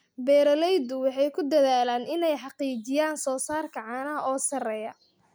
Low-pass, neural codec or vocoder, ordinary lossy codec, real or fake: none; none; none; real